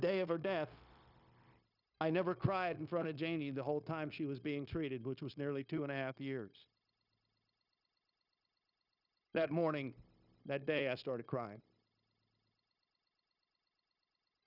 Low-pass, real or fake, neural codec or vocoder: 5.4 kHz; fake; codec, 16 kHz, 0.9 kbps, LongCat-Audio-Codec